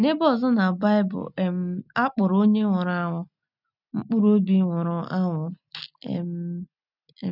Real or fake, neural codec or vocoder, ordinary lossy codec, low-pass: real; none; none; 5.4 kHz